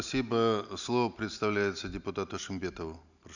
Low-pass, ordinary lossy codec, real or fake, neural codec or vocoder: 7.2 kHz; none; real; none